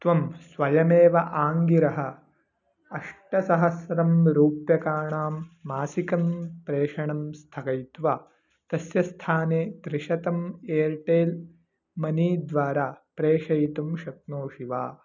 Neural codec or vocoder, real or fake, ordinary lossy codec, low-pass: none; real; none; 7.2 kHz